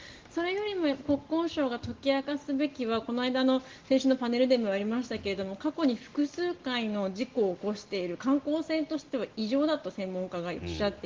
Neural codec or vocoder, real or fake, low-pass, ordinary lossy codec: none; real; 7.2 kHz; Opus, 16 kbps